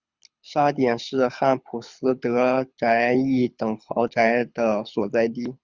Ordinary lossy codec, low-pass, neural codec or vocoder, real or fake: Opus, 64 kbps; 7.2 kHz; codec, 24 kHz, 6 kbps, HILCodec; fake